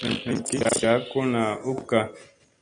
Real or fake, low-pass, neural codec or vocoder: real; 9.9 kHz; none